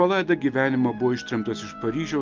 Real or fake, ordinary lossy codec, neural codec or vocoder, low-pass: real; Opus, 32 kbps; none; 7.2 kHz